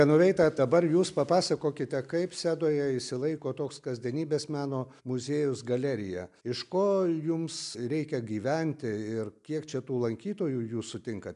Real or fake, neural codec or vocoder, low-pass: real; none; 10.8 kHz